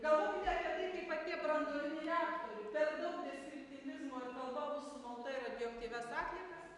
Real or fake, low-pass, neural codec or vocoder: real; 10.8 kHz; none